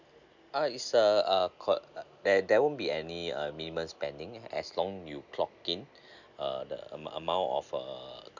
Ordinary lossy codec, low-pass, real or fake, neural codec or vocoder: none; 7.2 kHz; real; none